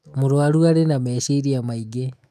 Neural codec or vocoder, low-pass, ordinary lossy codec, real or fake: autoencoder, 48 kHz, 128 numbers a frame, DAC-VAE, trained on Japanese speech; 14.4 kHz; none; fake